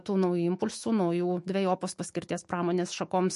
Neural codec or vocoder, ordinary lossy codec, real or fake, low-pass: autoencoder, 48 kHz, 128 numbers a frame, DAC-VAE, trained on Japanese speech; MP3, 48 kbps; fake; 14.4 kHz